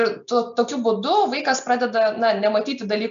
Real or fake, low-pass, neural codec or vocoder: real; 7.2 kHz; none